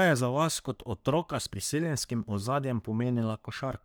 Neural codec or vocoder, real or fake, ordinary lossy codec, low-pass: codec, 44.1 kHz, 3.4 kbps, Pupu-Codec; fake; none; none